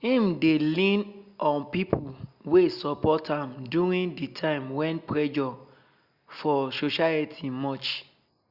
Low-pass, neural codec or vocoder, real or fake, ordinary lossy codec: 5.4 kHz; none; real; Opus, 64 kbps